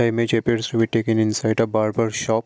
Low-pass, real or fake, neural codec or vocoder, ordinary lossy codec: none; real; none; none